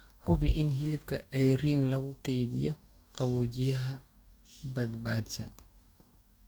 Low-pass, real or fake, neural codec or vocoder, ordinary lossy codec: none; fake; codec, 44.1 kHz, 2.6 kbps, DAC; none